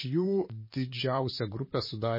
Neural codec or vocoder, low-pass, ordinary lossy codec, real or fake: vocoder, 44.1 kHz, 128 mel bands, Pupu-Vocoder; 5.4 kHz; MP3, 24 kbps; fake